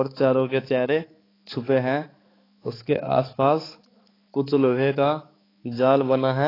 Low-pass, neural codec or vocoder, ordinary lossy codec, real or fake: 5.4 kHz; codec, 16 kHz, 4 kbps, X-Codec, HuBERT features, trained on balanced general audio; AAC, 24 kbps; fake